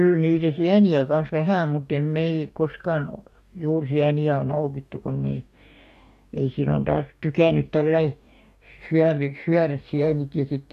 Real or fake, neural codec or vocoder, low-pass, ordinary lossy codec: fake; codec, 44.1 kHz, 2.6 kbps, DAC; 14.4 kHz; none